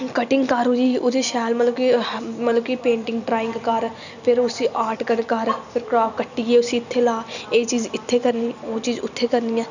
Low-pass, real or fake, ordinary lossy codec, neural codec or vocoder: 7.2 kHz; real; none; none